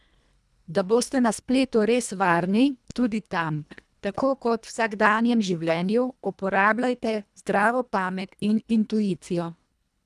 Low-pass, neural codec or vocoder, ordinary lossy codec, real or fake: none; codec, 24 kHz, 1.5 kbps, HILCodec; none; fake